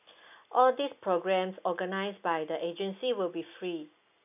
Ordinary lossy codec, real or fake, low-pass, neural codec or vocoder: none; real; 3.6 kHz; none